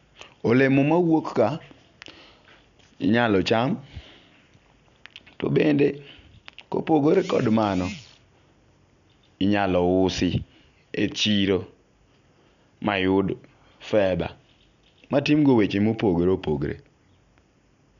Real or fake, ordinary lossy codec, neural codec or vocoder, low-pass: real; none; none; 7.2 kHz